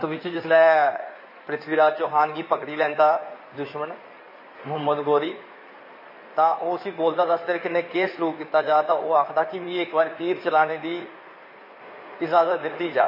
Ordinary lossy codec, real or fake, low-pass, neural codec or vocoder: MP3, 24 kbps; fake; 5.4 kHz; codec, 16 kHz in and 24 kHz out, 2.2 kbps, FireRedTTS-2 codec